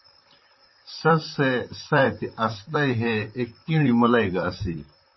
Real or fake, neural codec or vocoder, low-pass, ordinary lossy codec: fake; vocoder, 44.1 kHz, 128 mel bands, Pupu-Vocoder; 7.2 kHz; MP3, 24 kbps